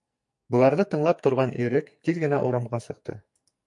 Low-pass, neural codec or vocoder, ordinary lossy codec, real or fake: 10.8 kHz; codec, 44.1 kHz, 2.6 kbps, SNAC; MP3, 64 kbps; fake